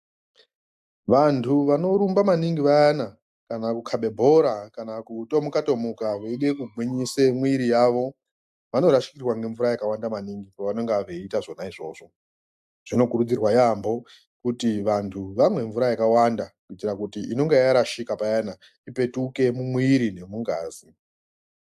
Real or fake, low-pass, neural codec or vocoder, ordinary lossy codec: real; 14.4 kHz; none; AAC, 96 kbps